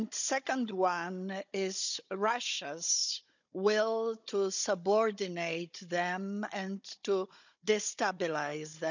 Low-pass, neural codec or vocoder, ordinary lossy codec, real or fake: 7.2 kHz; codec, 16 kHz, 16 kbps, FunCodec, trained on Chinese and English, 50 frames a second; none; fake